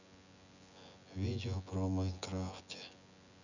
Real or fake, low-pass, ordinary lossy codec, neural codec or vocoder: fake; 7.2 kHz; none; vocoder, 24 kHz, 100 mel bands, Vocos